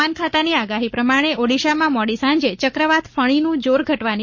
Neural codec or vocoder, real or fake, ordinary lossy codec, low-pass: codec, 44.1 kHz, 7.8 kbps, Pupu-Codec; fake; MP3, 32 kbps; 7.2 kHz